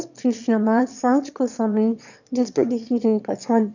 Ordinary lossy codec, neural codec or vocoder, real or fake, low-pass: none; autoencoder, 22.05 kHz, a latent of 192 numbers a frame, VITS, trained on one speaker; fake; 7.2 kHz